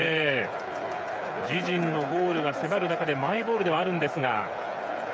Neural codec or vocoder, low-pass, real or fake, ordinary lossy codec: codec, 16 kHz, 8 kbps, FreqCodec, smaller model; none; fake; none